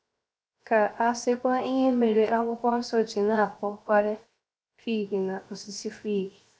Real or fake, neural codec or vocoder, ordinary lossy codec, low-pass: fake; codec, 16 kHz, 0.7 kbps, FocalCodec; none; none